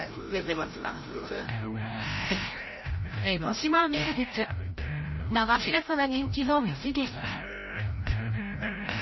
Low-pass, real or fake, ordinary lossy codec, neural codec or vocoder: 7.2 kHz; fake; MP3, 24 kbps; codec, 16 kHz, 0.5 kbps, FreqCodec, larger model